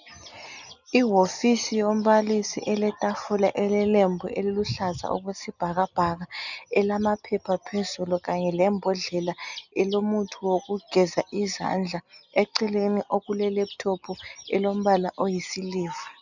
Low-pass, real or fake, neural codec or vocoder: 7.2 kHz; real; none